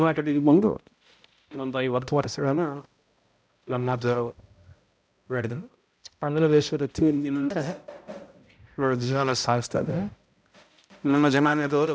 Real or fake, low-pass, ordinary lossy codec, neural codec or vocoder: fake; none; none; codec, 16 kHz, 0.5 kbps, X-Codec, HuBERT features, trained on balanced general audio